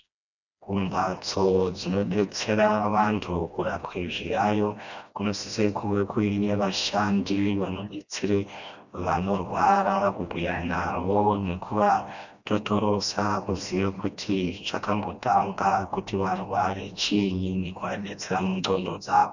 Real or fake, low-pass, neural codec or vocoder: fake; 7.2 kHz; codec, 16 kHz, 1 kbps, FreqCodec, smaller model